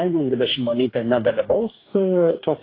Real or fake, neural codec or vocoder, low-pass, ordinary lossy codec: fake; codec, 44.1 kHz, 2.6 kbps, DAC; 5.4 kHz; AAC, 24 kbps